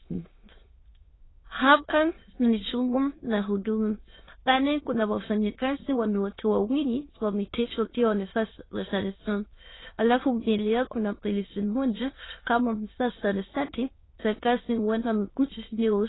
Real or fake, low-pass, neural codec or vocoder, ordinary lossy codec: fake; 7.2 kHz; autoencoder, 22.05 kHz, a latent of 192 numbers a frame, VITS, trained on many speakers; AAC, 16 kbps